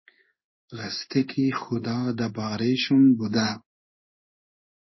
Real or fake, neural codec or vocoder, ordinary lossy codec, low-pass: fake; codec, 16 kHz in and 24 kHz out, 1 kbps, XY-Tokenizer; MP3, 24 kbps; 7.2 kHz